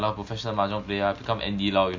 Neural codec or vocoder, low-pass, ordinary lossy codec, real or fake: none; 7.2 kHz; MP3, 64 kbps; real